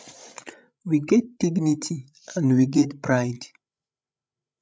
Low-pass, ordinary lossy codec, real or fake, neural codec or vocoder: none; none; fake; codec, 16 kHz, 16 kbps, FreqCodec, larger model